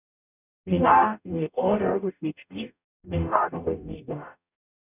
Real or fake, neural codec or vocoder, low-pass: fake; codec, 44.1 kHz, 0.9 kbps, DAC; 3.6 kHz